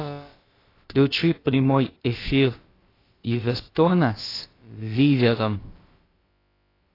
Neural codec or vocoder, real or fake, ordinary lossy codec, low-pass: codec, 16 kHz, about 1 kbps, DyCAST, with the encoder's durations; fake; AAC, 24 kbps; 5.4 kHz